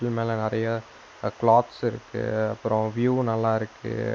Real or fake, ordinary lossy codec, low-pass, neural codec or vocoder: real; none; none; none